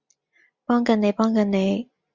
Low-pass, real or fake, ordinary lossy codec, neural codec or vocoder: 7.2 kHz; real; Opus, 64 kbps; none